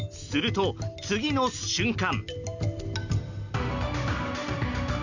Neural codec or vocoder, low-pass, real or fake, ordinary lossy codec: none; 7.2 kHz; real; none